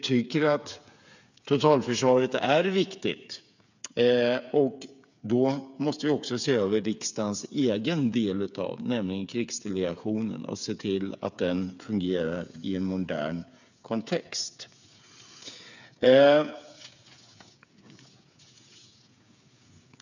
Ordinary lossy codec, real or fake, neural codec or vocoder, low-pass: none; fake; codec, 16 kHz, 8 kbps, FreqCodec, smaller model; 7.2 kHz